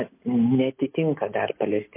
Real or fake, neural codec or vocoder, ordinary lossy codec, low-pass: real; none; MP3, 24 kbps; 3.6 kHz